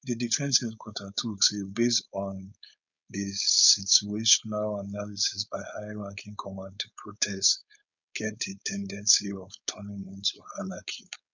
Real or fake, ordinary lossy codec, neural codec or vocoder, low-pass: fake; none; codec, 16 kHz, 4.8 kbps, FACodec; 7.2 kHz